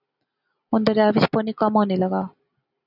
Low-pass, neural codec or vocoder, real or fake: 5.4 kHz; none; real